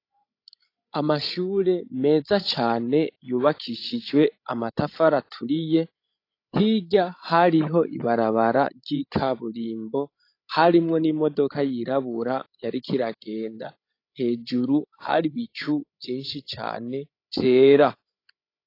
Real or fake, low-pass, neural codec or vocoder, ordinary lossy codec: fake; 5.4 kHz; codec, 16 kHz, 16 kbps, FreqCodec, larger model; AAC, 32 kbps